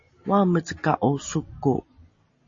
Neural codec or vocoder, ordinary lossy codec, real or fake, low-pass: none; MP3, 32 kbps; real; 7.2 kHz